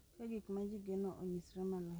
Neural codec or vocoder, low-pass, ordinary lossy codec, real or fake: none; none; none; real